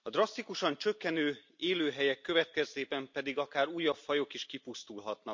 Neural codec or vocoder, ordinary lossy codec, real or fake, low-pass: none; MP3, 64 kbps; real; 7.2 kHz